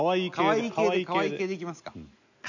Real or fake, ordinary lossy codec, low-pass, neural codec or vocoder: real; none; 7.2 kHz; none